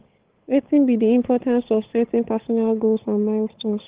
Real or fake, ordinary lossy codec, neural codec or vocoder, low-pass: fake; Opus, 16 kbps; codec, 16 kHz, 8 kbps, FunCodec, trained on Chinese and English, 25 frames a second; 3.6 kHz